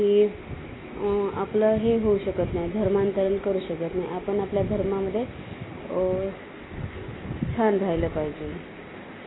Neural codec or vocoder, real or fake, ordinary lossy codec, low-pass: none; real; AAC, 16 kbps; 7.2 kHz